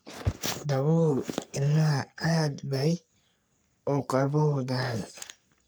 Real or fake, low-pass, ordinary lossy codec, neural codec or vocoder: fake; none; none; codec, 44.1 kHz, 3.4 kbps, Pupu-Codec